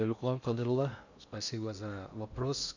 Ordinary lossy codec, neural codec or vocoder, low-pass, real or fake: none; codec, 16 kHz in and 24 kHz out, 0.6 kbps, FocalCodec, streaming, 2048 codes; 7.2 kHz; fake